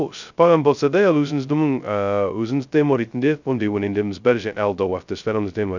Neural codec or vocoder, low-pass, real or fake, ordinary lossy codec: codec, 16 kHz, 0.2 kbps, FocalCodec; 7.2 kHz; fake; none